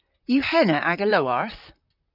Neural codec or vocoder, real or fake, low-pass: vocoder, 44.1 kHz, 128 mel bands, Pupu-Vocoder; fake; 5.4 kHz